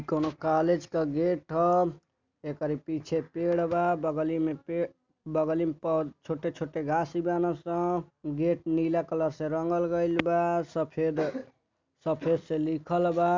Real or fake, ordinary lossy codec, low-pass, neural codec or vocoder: real; MP3, 64 kbps; 7.2 kHz; none